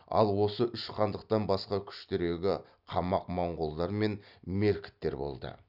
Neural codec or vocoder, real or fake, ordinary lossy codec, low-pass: none; real; none; 5.4 kHz